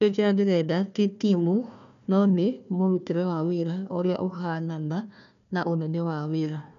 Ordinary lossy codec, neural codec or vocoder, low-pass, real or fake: none; codec, 16 kHz, 1 kbps, FunCodec, trained on Chinese and English, 50 frames a second; 7.2 kHz; fake